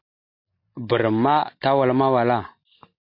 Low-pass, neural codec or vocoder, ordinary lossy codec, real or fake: 5.4 kHz; none; MP3, 32 kbps; real